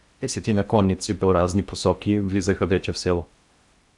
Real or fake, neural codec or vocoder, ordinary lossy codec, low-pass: fake; codec, 16 kHz in and 24 kHz out, 0.8 kbps, FocalCodec, streaming, 65536 codes; Opus, 64 kbps; 10.8 kHz